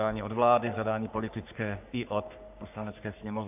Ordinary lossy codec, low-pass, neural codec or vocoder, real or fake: AAC, 32 kbps; 3.6 kHz; codec, 44.1 kHz, 3.4 kbps, Pupu-Codec; fake